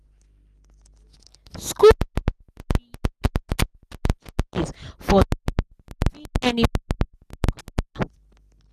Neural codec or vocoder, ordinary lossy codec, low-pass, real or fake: none; none; 14.4 kHz; real